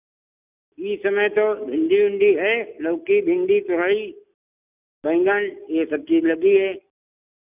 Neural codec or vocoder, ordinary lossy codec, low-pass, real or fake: none; none; 3.6 kHz; real